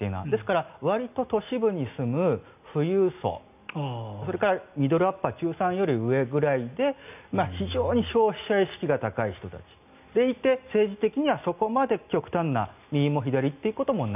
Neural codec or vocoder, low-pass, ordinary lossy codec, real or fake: none; 3.6 kHz; none; real